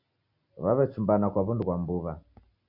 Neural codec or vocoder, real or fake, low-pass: none; real; 5.4 kHz